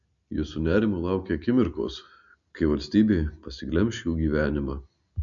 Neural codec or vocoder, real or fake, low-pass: none; real; 7.2 kHz